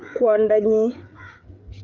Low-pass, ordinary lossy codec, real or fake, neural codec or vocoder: 7.2 kHz; Opus, 24 kbps; fake; codec, 16 kHz, 8 kbps, FreqCodec, larger model